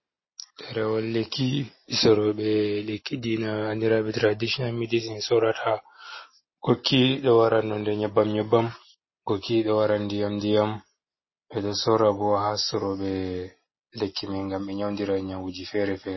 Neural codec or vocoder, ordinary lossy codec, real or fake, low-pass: none; MP3, 24 kbps; real; 7.2 kHz